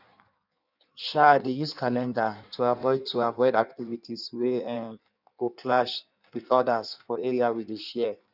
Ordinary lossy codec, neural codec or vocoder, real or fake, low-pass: none; codec, 16 kHz in and 24 kHz out, 1.1 kbps, FireRedTTS-2 codec; fake; 5.4 kHz